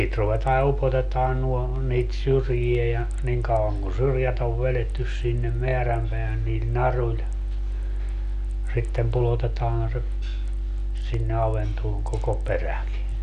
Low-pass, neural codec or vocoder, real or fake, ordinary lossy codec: 9.9 kHz; none; real; none